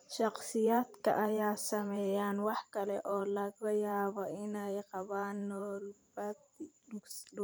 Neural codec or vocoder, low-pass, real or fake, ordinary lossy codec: vocoder, 44.1 kHz, 128 mel bands every 512 samples, BigVGAN v2; none; fake; none